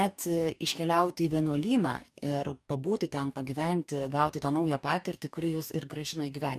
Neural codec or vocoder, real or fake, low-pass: codec, 44.1 kHz, 2.6 kbps, DAC; fake; 14.4 kHz